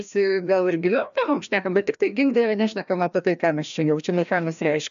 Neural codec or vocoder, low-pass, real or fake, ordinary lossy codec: codec, 16 kHz, 1 kbps, FreqCodec, larger model; 7.2 kHz; fake; AAC, 96 kbps